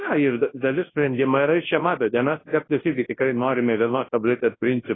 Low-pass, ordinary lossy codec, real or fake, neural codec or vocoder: 7.2 kHz; AAC, 16 kbps; fake; codec, 24 kHz, 0.9 kbps, WavTokenizer, large speech release